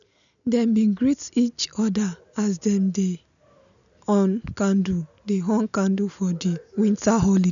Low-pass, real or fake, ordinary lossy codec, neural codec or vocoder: 7.2 kHz; real; none; none